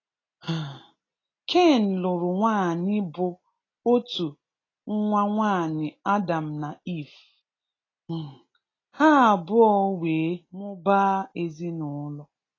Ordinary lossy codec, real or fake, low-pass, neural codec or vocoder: AAC, 32 kbps; real; 7.2 kHz; none